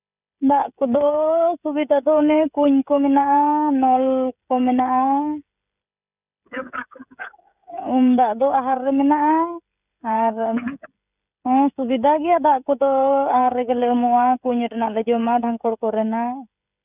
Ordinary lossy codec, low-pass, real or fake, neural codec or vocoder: none; 3.6 kHz; fake; codec, 16 kHz, 16 kbps, FreqCodec, smaller model